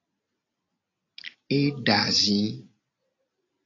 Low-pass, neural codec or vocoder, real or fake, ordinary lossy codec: 7.2 kHz; none; real; AAC, 32 kbps